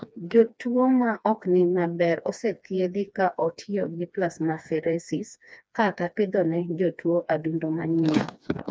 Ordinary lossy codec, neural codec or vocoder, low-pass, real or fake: none; codec, 16 kHz, 2 kbps, FreqCodec, smaller model; none; fake